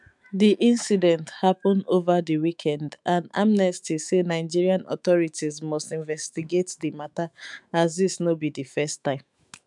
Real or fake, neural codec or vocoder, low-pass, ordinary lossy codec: fake; autoencoder, 48 kHz, 128 numbers a frame, DAC-VAE, trained on Japanese speech; 10.8 kHz; none